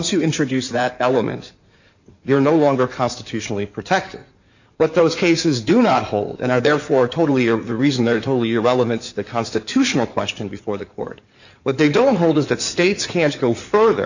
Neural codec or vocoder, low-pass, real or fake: codec, 16 kHz in and 24 kHz out, 2.2 kbps, FireRedTTS-2 codec; 7.2 kHz; fake